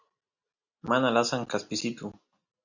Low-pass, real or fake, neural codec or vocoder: 7.2 kHz; real; none